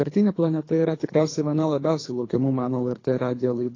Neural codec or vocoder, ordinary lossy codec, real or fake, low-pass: codec, 24 kHz, 3 kbps, HILCodec; AAC, 32 kbps; fake; 7.2 kHz